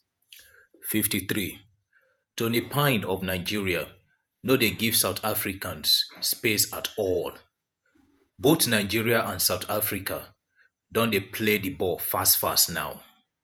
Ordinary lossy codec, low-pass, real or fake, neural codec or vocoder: none; none; fake; vocoder, 48 kHz, 128 mel bands, Vocos